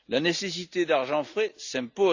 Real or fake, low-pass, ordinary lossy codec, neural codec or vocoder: real; 7.2 kHz; Opus, 64 kbps; none